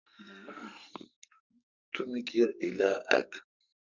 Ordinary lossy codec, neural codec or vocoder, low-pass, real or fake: Opus, 64 kbps; codec, 44.1 kHz, 2.6 kbps, SNAC; 7.2 kHz; fake